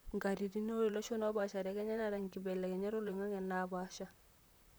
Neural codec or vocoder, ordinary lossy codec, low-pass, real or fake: vocoder, 44.1 kHz, 128 mel bands, Pupu-Vocoder; none; none; fake